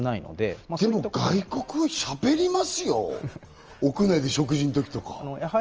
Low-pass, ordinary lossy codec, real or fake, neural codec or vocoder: 7.2 kHz; Opus, 24 kbps; real; none